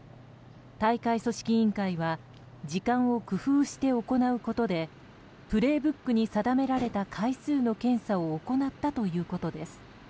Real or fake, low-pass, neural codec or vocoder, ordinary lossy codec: real; none; none; none